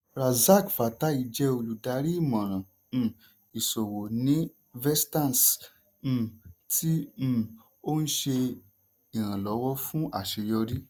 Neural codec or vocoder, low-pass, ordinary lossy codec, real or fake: none; none; none; real